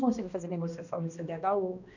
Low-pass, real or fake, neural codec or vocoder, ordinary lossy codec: 7.2 kHz; fake; codec, 16 kHz, 1 kbps, X-Codec, HuBERT features, trained on balanced general audio; none